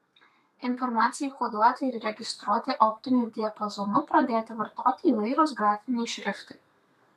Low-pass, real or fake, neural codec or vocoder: 14.4 kHz; fake; codec, 32 kHz, 1.9 kbps, SNAC